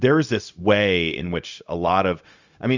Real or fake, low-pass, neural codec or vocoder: fake; 7.2 kHz; codec, 16 kHz, 0.4 kbps, LongCat-Audio-Codec